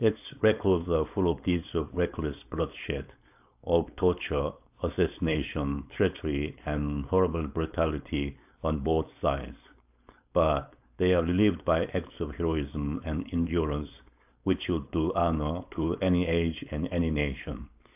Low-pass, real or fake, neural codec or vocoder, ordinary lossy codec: 3.6 kHz; fake; codec, 16 kHz, 4.8 kbps, FACodec; AAC, 32 kbps